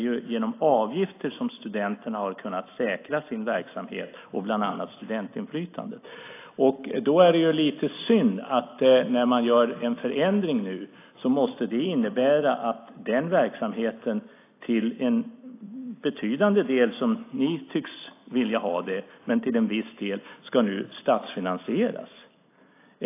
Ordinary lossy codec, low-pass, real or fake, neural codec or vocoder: AAC, 24 kbps; 3.6 kHz; real; none